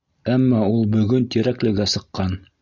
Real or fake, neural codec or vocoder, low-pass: real; none; 7.2 kHz